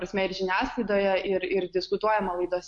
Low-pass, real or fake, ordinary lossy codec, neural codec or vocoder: 10.8 kHz; real; MP3, 48 kbps; none